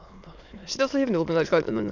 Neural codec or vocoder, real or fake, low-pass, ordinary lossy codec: autoencoder, 22.05 kHz, a latent of 192 numbers a frame, VITS, trained on many speakers; fake; 7.2 kHz; none